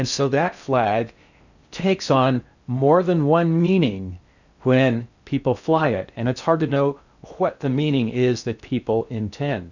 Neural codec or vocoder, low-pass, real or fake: codec, 16 kHz in and 24 kHz out, 0.6 kbps, FocalCodec, streaming, 4096 codes; 7.2 kHz; fake